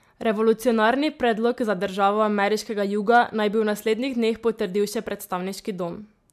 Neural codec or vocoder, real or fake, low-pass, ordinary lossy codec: none; real; 14.4 kHz; MP3, 96 kbps